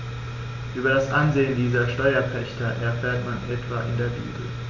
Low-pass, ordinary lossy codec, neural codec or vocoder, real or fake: 7.2 kHz; none; none; real